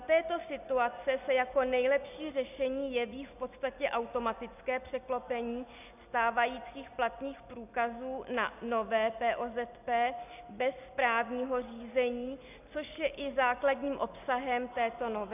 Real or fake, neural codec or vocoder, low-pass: real; none; 3.6 kHz